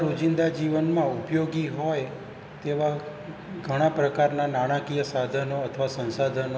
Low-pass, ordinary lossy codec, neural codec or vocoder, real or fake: none; none; none; real